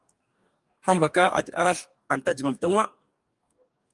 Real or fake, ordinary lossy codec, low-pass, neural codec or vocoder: fake; Opus, 32 kbps; 10.8 kHz; codec, 44.1 kHz, 2.6 kbps, DAC